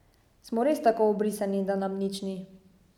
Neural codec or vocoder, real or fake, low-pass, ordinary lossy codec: none; real; 19.8 kHz; none